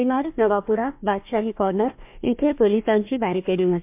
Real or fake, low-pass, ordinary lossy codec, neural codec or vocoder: fake; 3.6 kHz; MP3, 32 kbps; codec, 16 kHz, 1 kbps, FunCodec, trained on Chinese and English, 50 frames a second